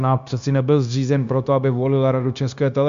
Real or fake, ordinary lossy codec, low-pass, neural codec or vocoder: fake; Opus, 64 kbps; 7.2 kHz; codec, 16 kHz, 0.9 kbps, LongCat-Audio-Codec